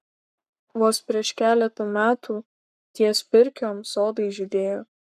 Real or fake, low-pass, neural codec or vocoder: fake; 14.4 kHz; codec, 44.1 kHz, 7.8 kbps, Pupu-Codec